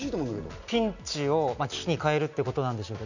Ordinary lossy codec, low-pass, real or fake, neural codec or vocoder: none; 7.2 kHz; real; none